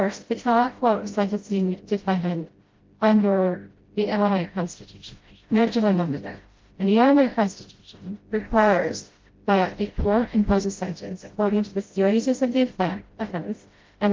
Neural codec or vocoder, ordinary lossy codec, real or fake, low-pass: codec, 16 kHz, 0.5 kbps, FreqCodec, smaller model; Opus, 32 kbps; fake; 7.2 kHz